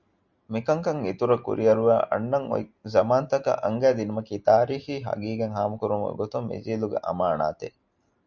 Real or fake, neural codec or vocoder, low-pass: real; none; 7.2 kHz